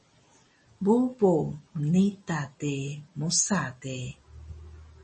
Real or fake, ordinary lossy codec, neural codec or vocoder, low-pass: real; MP3, 32 kbps; none; 10.8 kHz